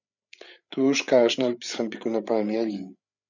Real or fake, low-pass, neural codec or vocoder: fake; 7.2 kHz; codec, 16 kHz, 8 kbps, FreqCodec, larger model